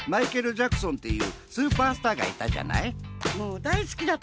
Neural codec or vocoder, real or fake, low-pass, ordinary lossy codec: none; real; none; none